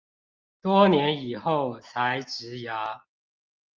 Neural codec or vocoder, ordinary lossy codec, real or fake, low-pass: none; Opus, 24 kbps; real; 7.2 kHz